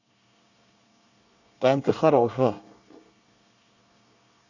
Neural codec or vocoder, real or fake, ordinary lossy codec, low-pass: codec, 24 kHz, 1 kbps, SNAC; fake; none; 7.2 kHz